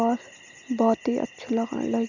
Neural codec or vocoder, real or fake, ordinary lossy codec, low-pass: none; real; none; 7.2 kHz